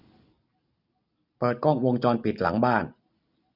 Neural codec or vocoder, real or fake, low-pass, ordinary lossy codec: none; real; 5.4 kHz; none